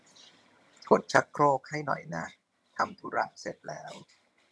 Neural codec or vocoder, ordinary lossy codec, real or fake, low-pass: vocoder, 22.05 kHz, 80 mel bands, HiFi-GAN; none; fake; none